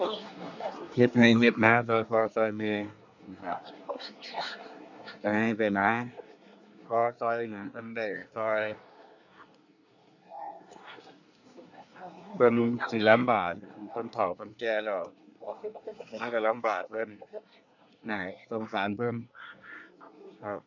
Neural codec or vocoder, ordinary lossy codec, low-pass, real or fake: codec, 24 kHz, 1 kbps, SNAC; none; 7.2 kHz; fake